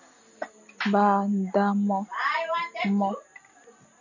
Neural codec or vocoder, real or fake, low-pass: none; real; 7.2 kHz